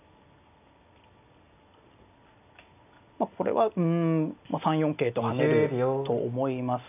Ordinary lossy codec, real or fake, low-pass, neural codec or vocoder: none; real; 3.6 kHz; none